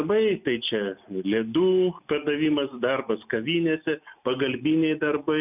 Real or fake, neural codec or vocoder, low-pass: real; none; 3.6 kHz